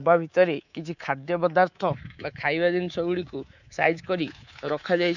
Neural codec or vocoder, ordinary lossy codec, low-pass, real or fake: codec, 24 kHz, 3.1 kbps, DualCodec; none; 7.2 kHz; fake